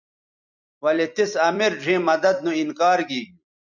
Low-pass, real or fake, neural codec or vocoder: 7.2 kHz; fake; vocoder, 44.1 kHz, 128 mel bands every 256 samples, BigVGAN v2